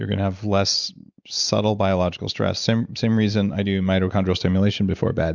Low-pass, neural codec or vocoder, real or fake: 7.2 kHz; none; real